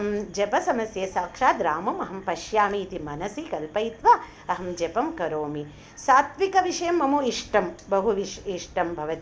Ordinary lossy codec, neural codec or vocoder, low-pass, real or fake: none; none; none; real